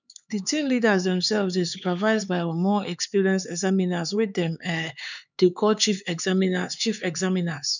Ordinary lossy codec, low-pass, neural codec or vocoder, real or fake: none; 7.2 kHz; codec, 16 kHz, 4 kbps, X-Codec, HuBERT features, trained on LibriSpeech; fake